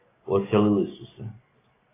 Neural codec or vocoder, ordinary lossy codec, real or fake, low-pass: none; AAC, 16 kbps; real; 3.6 kHz